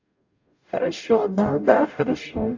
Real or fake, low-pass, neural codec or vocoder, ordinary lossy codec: fake; 7.2 kHz; codec, 44.1 kHz, 0.9 kbps, DAC; AAC, 48 kbps